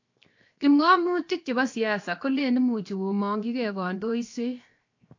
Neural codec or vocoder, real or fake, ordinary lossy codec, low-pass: codec, 16 kHz, 0.7 kbps, FocalCodec; fake; AAC, 48 kbps; 7.2 kHz